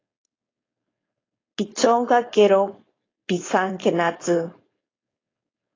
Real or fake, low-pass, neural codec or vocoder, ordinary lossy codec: fake; 7.2 kHz; codec, 16 kHz, 4.8 kbps, FACodec; AAC, 32 kbps